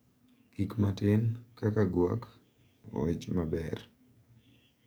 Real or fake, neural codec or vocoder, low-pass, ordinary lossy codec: fake; codec, 44.1 kHz, 7.8 kbps, DAC; none; none